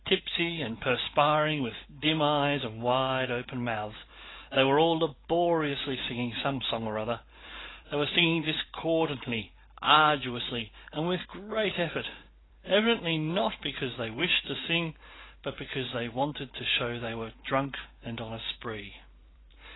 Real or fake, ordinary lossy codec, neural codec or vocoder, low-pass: fake; AAC, 16 kbps; vocoder, 44.1 kHz, 128 mel bands every 512 samples, BigVGAN v2; 7.2 kHz